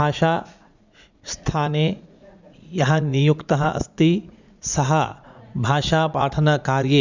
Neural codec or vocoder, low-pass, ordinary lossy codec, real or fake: vocoder, 44.1 kHz, 80 mel bands, Vocos; 7.2 kHz; Opus, 64 kbps; fake